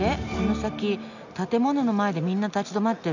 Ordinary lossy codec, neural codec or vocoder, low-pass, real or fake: none; none; 7.2 kHz; real